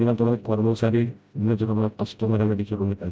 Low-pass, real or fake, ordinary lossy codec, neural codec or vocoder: none; fake; none; codec, 16 kHz, 0.5 kbps, FreqCodec, smaller model